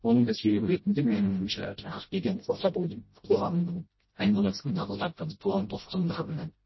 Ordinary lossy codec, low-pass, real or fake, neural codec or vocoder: MP3, 24 kbps; 7.2 kHz; fake; codec, 16 kHz, 0.5 kbps, FreqCodec, smaller model